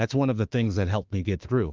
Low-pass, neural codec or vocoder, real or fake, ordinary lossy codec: 7.2 kHz; autoencoder, 48 kHz, 32 numbers a frame, DAC-VAE, trained on Japanese speech; fake; Opus, 24 kbps